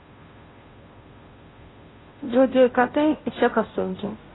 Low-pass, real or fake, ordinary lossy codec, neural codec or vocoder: 7.2 kHz; fake; AAC, 16 kbps; codec, 16 kHz, 0.5 kbps, FunCodec, trained on Chinese and English, 25 frames a second